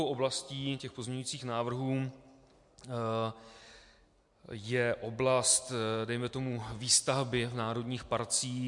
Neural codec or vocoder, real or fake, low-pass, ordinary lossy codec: none; real; 10.8 kHz; MP3, 64 kbps